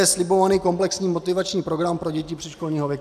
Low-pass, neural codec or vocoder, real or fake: 14.4 kHz; none; real